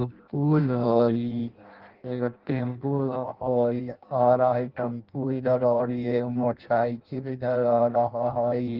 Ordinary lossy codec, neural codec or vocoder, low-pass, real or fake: Opus, 16 kbps; codec, 16 kHz in and 24 kHz out, 0.6 kbps, FireRedTTS-2 codec; 5.4 kHz; fake